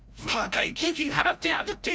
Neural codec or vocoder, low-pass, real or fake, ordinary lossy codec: codec, 16 kHz, 0.5 kbps, FreqCodec, larger model; none; fake; none